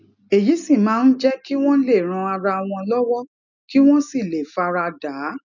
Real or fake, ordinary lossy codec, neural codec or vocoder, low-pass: real; none; none; 7.2 kHz